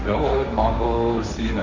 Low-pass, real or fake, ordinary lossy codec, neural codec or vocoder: 7.2 kHz; fake; MP3, 48 kbps; codec, 16 kHz, 1.1 kbps, Voila-Tokenizer